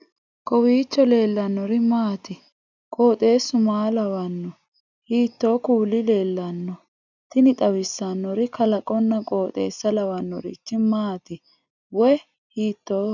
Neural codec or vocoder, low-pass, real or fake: none; 7.2 kHz; real